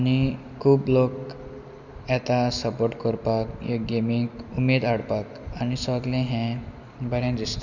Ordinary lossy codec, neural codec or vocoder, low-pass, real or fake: none; none; 7.2 kHz; real